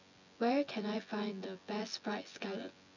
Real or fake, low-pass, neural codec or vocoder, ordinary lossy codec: fake; 7.2 kHz; vocoder, 24 kHz, 100 mel bands, Vocos; none